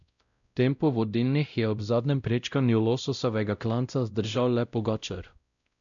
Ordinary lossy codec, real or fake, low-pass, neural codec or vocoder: none; fake; 7.2 kHz; codec, 16 kHz, 0.5 kbps, X-Codec, WavLM features, trained on Multilingual LibriSpeech